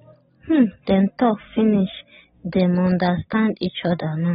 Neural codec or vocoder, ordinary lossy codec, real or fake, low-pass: none; AAC, 16 kbps; real; 19.8 kHz